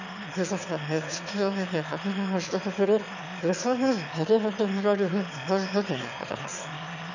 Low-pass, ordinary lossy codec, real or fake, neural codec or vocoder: 7.2 kHz; none; fake; autoencoder, 22.05 kHz, a latent of 192 numbers a frame, VITS, trained on one speaker